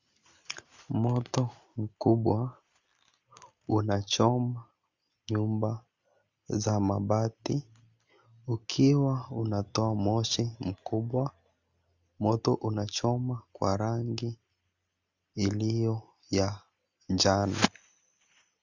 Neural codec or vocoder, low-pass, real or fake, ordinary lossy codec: none; 7.2 kHz; real; Opus, 64 kbps